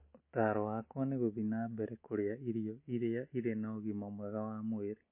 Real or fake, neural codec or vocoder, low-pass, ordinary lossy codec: real; none; 3.6 kHz; MP3, 24 kbps